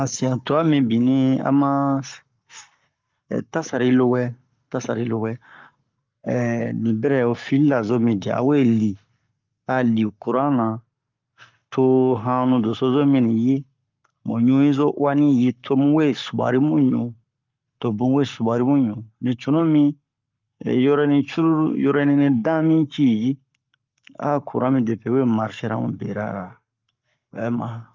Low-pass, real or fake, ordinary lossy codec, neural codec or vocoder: 7.2 kHz; fake; Opus, 24 kbps; codec, 44.1 kHz, 7.8 kbps, Pupu-Codec